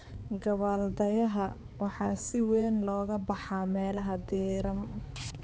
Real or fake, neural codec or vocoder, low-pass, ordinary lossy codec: fake; codec, 16 kHz, 4 kbps, X-Codec, HuBERT features, trained on balanced general audio; none; none